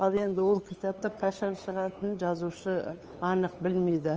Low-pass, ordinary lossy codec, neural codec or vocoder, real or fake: 7.2 kHz; Opus, 24 kbps; codec, 16 kHz, 8 kbps, FunCodec, trained on LibriTTS, 25 frames a second; fake